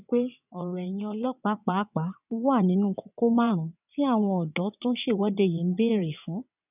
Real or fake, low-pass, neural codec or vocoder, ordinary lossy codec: fake; 3.6 kHz; vocoder, 22.05 kHz, 80 mel bands, WaveNeXt; none